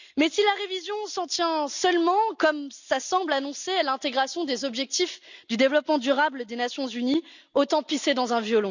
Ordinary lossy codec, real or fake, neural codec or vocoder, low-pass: none; real; none; 7.2 kHz